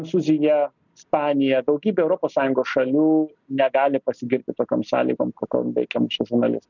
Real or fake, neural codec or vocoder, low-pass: real; none; 7.2 kHz